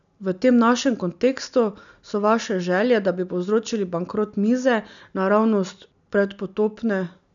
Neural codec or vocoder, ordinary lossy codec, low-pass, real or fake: none; none; 7.2 kHz; real